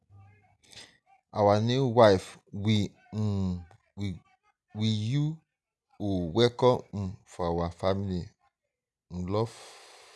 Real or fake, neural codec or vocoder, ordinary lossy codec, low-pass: real; none; none; none